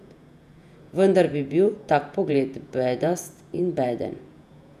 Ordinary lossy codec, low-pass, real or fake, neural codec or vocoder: none; 14.4 kHz; fake; vocoder, 48 kHz, 128 mel bands, Vocos